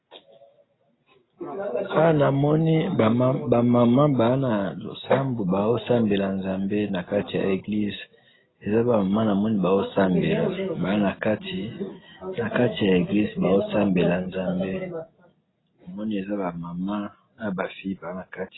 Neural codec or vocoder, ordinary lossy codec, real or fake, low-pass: none; AAC, 16 kbps; real; 7.2 kHz